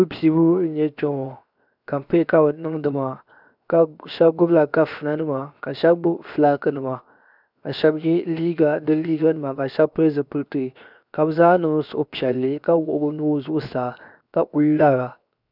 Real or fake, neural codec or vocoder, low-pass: fake; codec, 16 kHz, 0.7 kbps, FocalCodec; 5.4 kHz